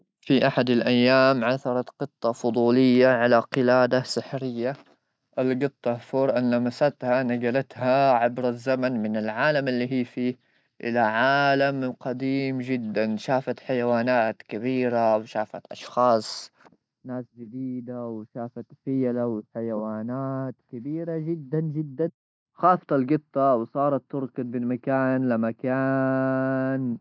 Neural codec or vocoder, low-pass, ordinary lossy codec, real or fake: none; none; none; real